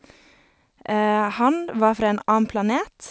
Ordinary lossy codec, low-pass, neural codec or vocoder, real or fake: none; none; none; real